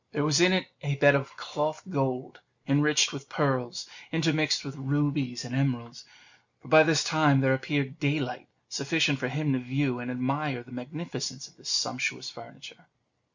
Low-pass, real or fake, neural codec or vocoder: 7.2 kHz; real; none